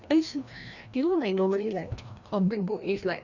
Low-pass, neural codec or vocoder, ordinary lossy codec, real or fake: 7.2 kHz; codec, 16 kHz, 1 kbps, FreqCodec, larger model; none; fake